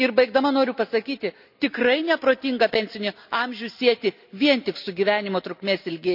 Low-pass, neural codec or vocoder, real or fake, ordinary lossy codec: 5.4 kHz; none; real; none